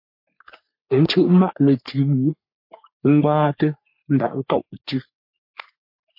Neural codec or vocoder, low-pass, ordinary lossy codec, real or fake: codec, 44.1 kHz, 3.4 kbps, Pupu-Codec; 5.4 kHz; MP3, 32 kbps; fake